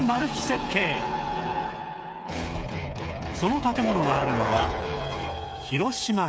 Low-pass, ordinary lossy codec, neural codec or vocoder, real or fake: none; none; codec, 16 kHz, 8 kbps, FreqCodec, smaller model; fake